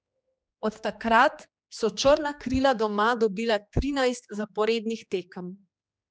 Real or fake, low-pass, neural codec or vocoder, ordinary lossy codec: fake; none; codec, 16 kHz, 2 kbps, X-Codec, HuBERT features, trained on general audio; none